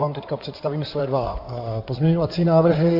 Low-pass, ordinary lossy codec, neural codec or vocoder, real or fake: 5.4 kHz; AAC, 48 kbps; codec, 16 kHz in and 24 kHz out, 2.2 kbps, FireRedTTS-2 codec; fake